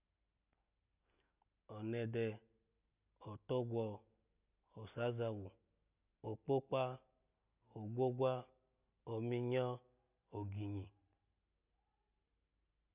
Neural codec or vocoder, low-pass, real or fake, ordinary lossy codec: none; 3.6 kHz; real; none